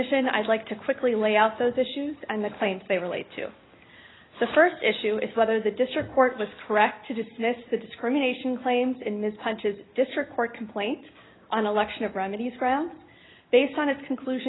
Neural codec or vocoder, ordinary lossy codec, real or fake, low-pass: none; AAC, 16 kbps; real; 7.2 kHz